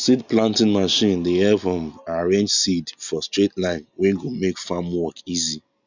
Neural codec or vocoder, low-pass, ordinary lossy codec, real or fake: none; 7.2 kHz; none; real